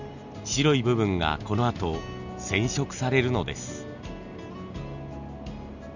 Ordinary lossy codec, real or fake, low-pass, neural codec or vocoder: none; real; 7.2 kHz; none